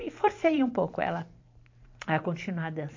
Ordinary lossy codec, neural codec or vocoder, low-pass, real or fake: MP3, 48 kbps; vocoder, 44.1 kHz, 128 mel bands every 512 samples, BigVGAN v2; 7.2 kHz; fake